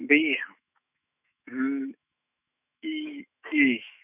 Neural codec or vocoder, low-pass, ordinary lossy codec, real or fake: autoencoder, 48 kHz, 128 numbers a frame, DAC-VAE, trained on Japanese speech; 3.6 kHz; none; fake